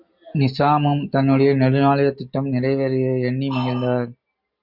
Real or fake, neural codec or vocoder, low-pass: real; none; 5.4 kHz